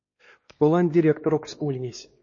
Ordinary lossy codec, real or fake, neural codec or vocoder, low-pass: MP3, 32 kbps; fake; codec, 16 kHz, 2 kbps, X-Codec, WavLM features, trained on Multilingual LibriSpeech; 7.2 kHz